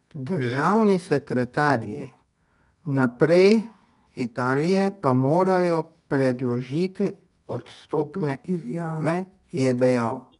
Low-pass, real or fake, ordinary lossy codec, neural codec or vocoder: 10.8 kHz; fake; none; codec, 24 kHz, 0.9 kbps, WavTokenizer, medium music audio release